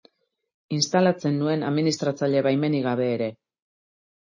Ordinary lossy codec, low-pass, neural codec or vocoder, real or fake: MP3, 32 kbps; 7.2 kHz; none; real